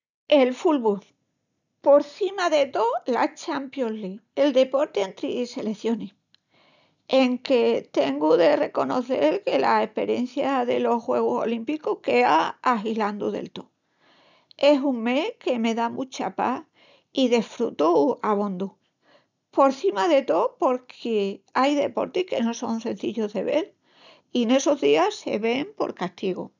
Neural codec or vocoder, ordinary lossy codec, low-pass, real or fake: none; none; 7.2 kHz; real